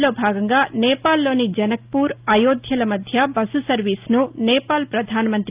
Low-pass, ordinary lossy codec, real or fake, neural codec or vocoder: 3.6 kHz; Opus, 32 kbps; real; none